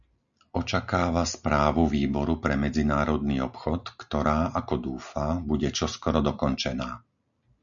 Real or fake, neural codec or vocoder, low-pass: real; none; 7.2 kHz